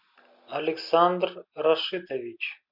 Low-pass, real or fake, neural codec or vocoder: 5.4 kHz; real; none